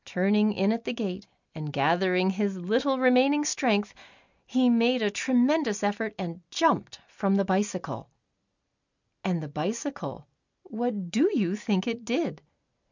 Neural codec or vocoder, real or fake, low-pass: none; real; 7.2 kHz